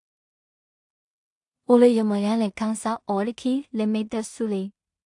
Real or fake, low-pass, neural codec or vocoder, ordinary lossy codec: fake; 10.8 kHz; codec, 16 kHz in and 24 kHz out, 0.4 kbps, LongCat-Audio-Codec, two codebook decoder; AAC, 64 kbps